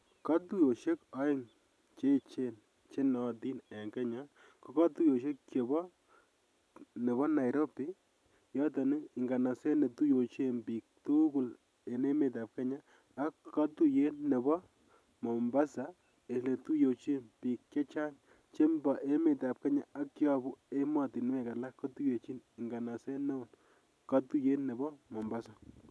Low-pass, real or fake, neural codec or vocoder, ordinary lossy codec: none; real; none; none